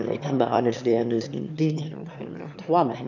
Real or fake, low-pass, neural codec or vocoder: fake; 7.2 kHz; autoencoder, 22.05 kHz, a latent of 192 numbers a frame, VITS, trained on one speaker